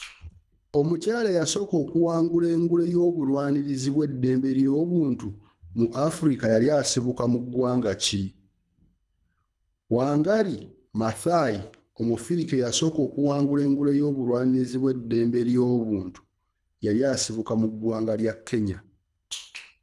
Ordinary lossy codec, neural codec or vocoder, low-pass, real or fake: none; codec, 24 kHz, 3 kbps, HILCodec; none; fake